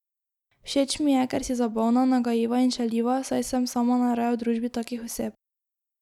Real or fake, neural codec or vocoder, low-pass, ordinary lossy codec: real; none; 19.8 kHz; none